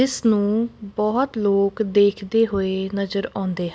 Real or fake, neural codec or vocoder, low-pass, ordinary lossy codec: real; none; none; none